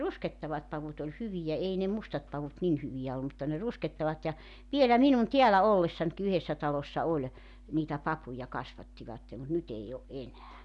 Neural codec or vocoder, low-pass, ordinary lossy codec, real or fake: none; 10.8 kHz; none; real